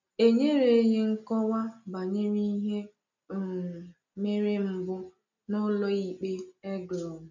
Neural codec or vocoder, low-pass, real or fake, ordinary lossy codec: none; 7.2 kHz; real; none